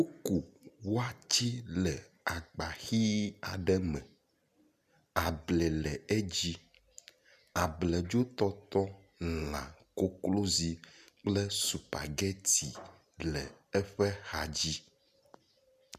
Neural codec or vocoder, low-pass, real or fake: none; 14.4 kHz; real